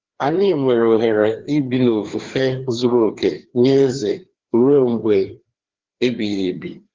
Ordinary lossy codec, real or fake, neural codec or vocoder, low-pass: Opus, 16 kbps; fake; codec, 16 kHz, 2 kbps, FreqCodec, larger model; 7.2 kHz